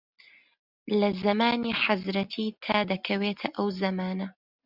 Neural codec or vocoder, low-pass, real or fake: none; 5.4 kHz; real